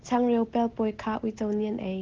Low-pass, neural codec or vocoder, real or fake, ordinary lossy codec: 7.2 kHz; none; real; Opus, 16 kbps